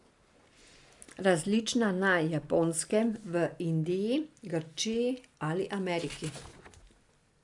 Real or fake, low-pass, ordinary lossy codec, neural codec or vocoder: real; 10.8 kHz; AAC, 64 kbps; none